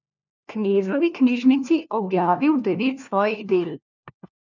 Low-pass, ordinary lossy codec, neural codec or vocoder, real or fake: 7.2 kHz; none; codec, 16 kHz, 1 kbps, FunCodec, trained on LibriTTS, 50 frames a second; fake